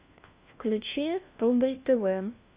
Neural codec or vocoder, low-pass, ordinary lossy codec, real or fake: codec, 16 kHz, 1 kbps, FunCodec, trained on LibriTTS, 50 frames a second; 3.6 kHz; Opus, 64 kbps; fake